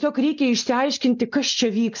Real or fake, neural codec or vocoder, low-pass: real; none; 7.2 kHz